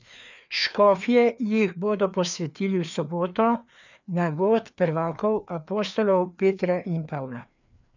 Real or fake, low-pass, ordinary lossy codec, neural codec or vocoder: fake; 7.2 kHz; none; codec, 16 kHz, 2 kbps, FreqCodec, larger model